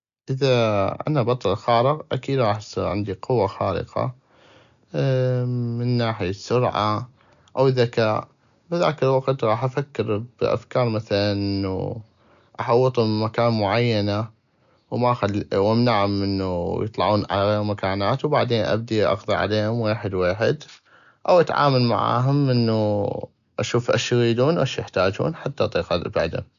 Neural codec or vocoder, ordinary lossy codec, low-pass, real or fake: none; none; 7.2 kHz; real